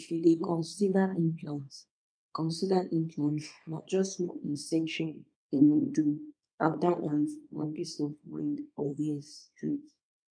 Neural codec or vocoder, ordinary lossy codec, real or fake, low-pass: codec, 24 kHz, 0.9 kbps, WavTokenizer, small release; none; fake; 9.9 kHz